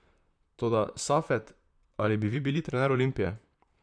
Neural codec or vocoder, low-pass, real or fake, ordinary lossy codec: vocoder, 44.1 kHz, 128 mel bands, Pupu-Vocoder; 9.9 kHz; fake; none